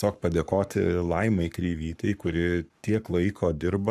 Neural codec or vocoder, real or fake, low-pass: codec, 44.1 kHz, 7.8 kbps, Pupu-Codec; fake; 14.4 kHz